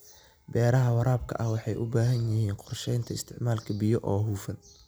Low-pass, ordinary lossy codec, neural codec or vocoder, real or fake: none; none; none; real